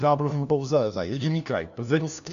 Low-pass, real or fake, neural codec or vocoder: 7.2 kHz; fake; codec, 16 kHz, 1 kbps, FunCodec, trained on LibriTTS, 50 frames a second